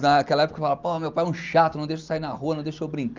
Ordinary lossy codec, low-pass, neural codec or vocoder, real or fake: Opus, 24 kbps; 7.2 kHz; none; real